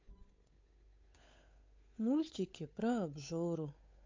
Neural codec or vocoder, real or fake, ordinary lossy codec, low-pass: codec, 16 kHz, 8 kbps, FunCodec, trained on Chinese and English, 25 frames a second; fake; MP3, 48 kbps; 7.2 kHz